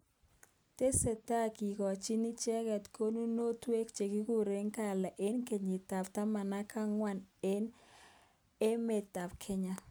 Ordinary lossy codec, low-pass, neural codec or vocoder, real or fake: none; none; none; real